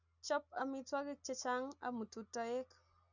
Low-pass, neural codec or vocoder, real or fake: 7.2 kHz; none; real